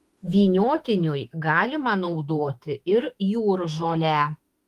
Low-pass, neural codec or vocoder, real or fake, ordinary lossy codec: 14.4 kHz; autoencoder, 48 kHz, 32 numbers a frame, DAC-VAE, trained on Japanese speech; fake; Opus, 32 kbps